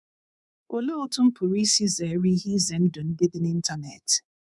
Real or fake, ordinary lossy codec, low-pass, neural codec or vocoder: fake; none; 10.8 kHz; codec, 24 kHz, 3.1 kbps, DualCodec